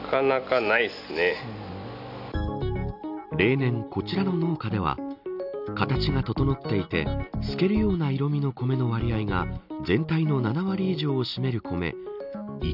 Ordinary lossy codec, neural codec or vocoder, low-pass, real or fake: none; none; 5.4 kHz; real